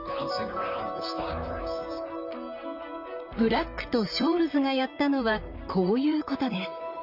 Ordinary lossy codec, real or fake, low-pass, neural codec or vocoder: none; fake; 5.4 kHz; vocoder, 44.1 kHz, 128 mel bands, Pupu-Vocoder